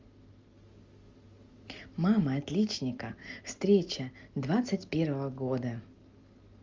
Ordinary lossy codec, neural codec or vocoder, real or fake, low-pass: Opus, 32 kbps; none; real; 7.2 kHz